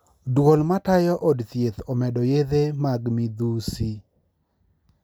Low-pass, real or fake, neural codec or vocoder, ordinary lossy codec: none; real; none; none